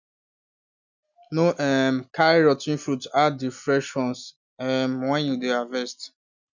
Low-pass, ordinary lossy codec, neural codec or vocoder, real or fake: 7.2 kHz; none; none; real